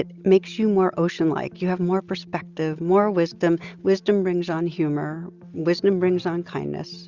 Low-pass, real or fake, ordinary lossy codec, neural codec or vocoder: 7.2 kHz; real; Opus, 64 kbps; none